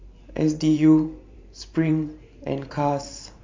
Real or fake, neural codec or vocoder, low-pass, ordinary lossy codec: fake; vocoder, 44.1 kHz, 128 mel bands every 512 samples, BigVGAN v2; 7.2 kHz; MP3, 48 kbps